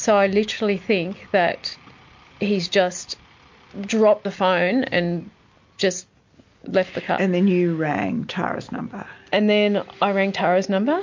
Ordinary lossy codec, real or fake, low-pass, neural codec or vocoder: MP3, 48 kbps; real; 7.2 kHz; none